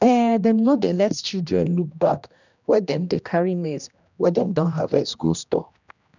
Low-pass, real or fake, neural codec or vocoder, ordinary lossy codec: 7.2 kHz; fake; codec, 16 kHz, 1 kbps, X-Codec, HuBERT features, trained on general audio; none